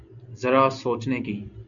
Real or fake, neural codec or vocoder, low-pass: real; none; 7.2 kHz